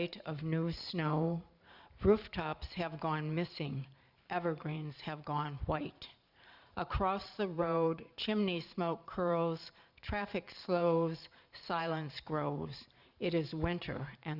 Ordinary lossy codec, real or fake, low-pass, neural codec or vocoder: Opus, 64 kbps; fake; 5.4 kHz; vocoder, 44.1 kHz, 128 mel bands every 512 samples, BigVGAN v2